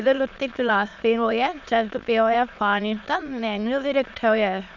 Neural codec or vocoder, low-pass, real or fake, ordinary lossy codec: autoencoder, 22.05 kHz, a latent of 192 numbers a frame, VITS, trained on many speakers; 7.2 kHz; fake; none